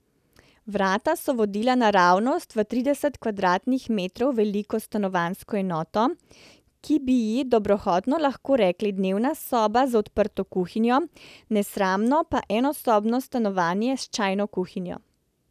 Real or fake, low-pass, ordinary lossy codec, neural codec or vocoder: real; 14.4 kHz; none; none